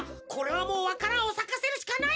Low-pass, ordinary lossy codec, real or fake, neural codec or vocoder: none; none; real; none